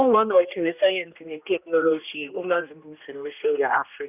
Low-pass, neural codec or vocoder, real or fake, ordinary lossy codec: 3.6 kHz; codec, 16 kHz, 1 kbps, X-Codec, HuBERT features, trained on general audio; fake; none